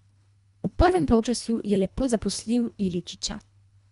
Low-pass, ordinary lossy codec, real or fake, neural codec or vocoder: 10.8 kHz; none; fake; codec, 24 kHz, 1.5 kbps, HILCodec